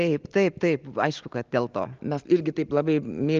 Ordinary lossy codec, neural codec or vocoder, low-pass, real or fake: Opus, 24 kbps; none; 7.2 kHz; real